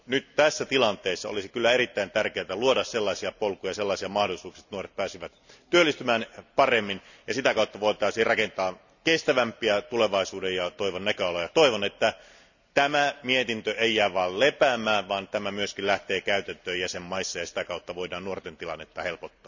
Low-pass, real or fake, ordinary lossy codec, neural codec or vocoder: 7.2 kHz; real; none; none